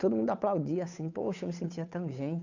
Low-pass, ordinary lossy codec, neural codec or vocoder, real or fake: 7.2 kHz; none; codec, 16 kHz, 8 kbps, FunCodec, trained on Chinese and English, 25 frames a second; fake